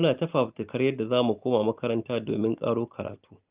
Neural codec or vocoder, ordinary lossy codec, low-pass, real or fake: none; Opus, 24 kbps; 3.6 kHz; real